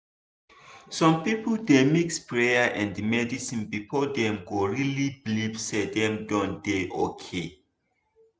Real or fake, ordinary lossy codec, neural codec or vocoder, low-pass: real; none; none; none